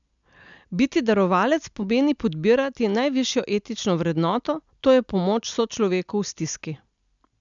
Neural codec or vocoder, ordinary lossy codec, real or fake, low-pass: none; MP3, 96 kbps; real; 7.2 kHz